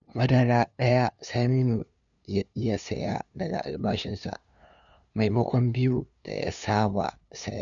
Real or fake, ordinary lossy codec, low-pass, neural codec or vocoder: fake; none; 7.2 kHz; codec, 16 kHz, 2 kbps, FunCodec, trained on LibriTTS, 25 frames a second